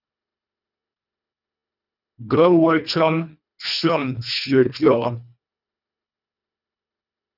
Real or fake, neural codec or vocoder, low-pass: fake; codec, 24 kHz, 1.5 kbps, HILCodec; 5.4 kHz